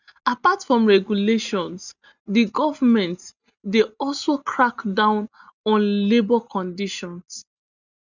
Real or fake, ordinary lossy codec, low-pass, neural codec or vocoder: real; none; 7.2 kHz; none